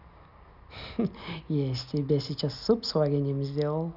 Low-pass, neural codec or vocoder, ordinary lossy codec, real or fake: 5.4 kHz; none; none; real